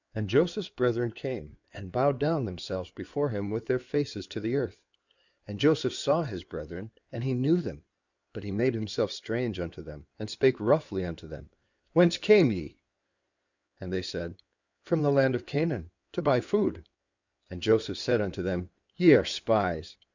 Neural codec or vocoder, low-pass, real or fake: codec, 16 kHz in and 24 kHz out, 2.2 kbps, FireRedTTS-2 codec; 7.2 kHz; fake